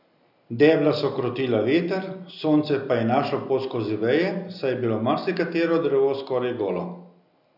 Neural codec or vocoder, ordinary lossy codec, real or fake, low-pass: none; none; real; 5.4 kHz